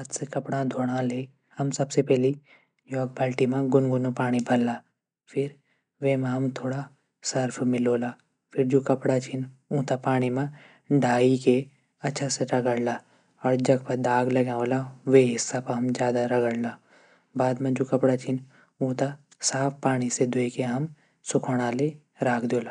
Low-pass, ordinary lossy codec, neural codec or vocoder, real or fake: 9.9 kHz; none; none; real